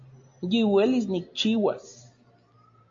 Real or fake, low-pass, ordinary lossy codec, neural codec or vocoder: real; 7.2 kHz; AAC, 48 kbps; none